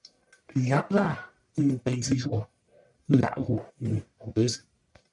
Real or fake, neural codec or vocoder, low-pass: fake; codec, 44.1 kHz, 1.7 kbps, Pupu-Codec; 10.8 kHz